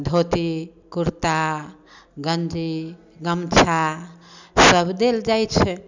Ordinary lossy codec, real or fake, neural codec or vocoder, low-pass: none; real; none; 7.2 kHz